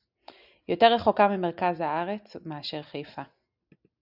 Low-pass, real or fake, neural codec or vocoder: 5.4 kHz; real; none